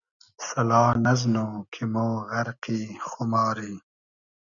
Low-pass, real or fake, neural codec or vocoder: 7.2 kHz; real; none